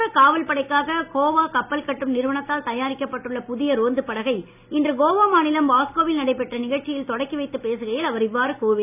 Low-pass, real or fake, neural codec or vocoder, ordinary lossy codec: 3.6 kHz; real; none; none